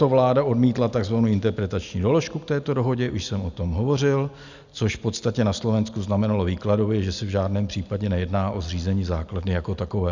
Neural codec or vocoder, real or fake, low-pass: none; real; 7.2 kHz